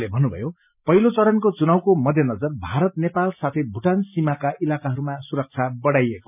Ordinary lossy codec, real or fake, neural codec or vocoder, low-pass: none; real; none; 3.6 kHz